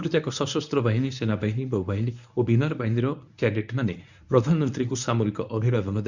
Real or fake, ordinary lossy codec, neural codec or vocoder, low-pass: fake; none; codec, 24 kHz, 0.9 kbps, WavTokenizer, medium speech release version 1; 7.2 kHz